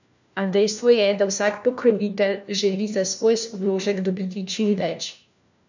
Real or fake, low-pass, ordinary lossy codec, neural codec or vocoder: fake; 7.2 kHz; none; codec, 16 kHz, 1 kbps, FunCodec, trained on LibriTTS, 50 frames a second